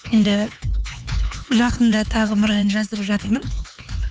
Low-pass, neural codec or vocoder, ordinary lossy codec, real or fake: none; codec, 16 kHz, 4 kbps, X-Codec, HuBERT features, trained on LibriSpeech; none; fake